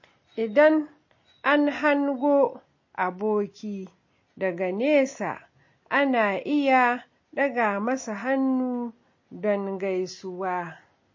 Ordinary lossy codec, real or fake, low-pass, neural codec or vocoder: MP3, 32 kbps; real; 7.2 kHz; none